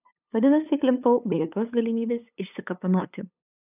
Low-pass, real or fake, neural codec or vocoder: 3.6 kHz; fake; codec, 16 kHz, 8 kbps, FunCodec, trained on LibriTTS, 25 frames a second